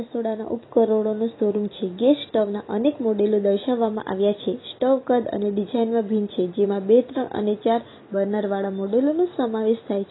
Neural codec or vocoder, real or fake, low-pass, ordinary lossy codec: none; real; 7.2 kHz; AAC, 16 kbps